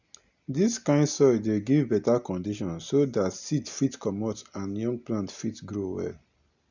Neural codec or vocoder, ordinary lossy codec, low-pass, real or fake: none; none; 7.2 kHz; real